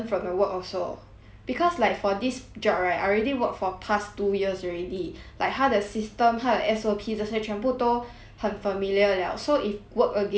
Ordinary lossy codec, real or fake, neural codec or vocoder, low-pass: none; real; none; none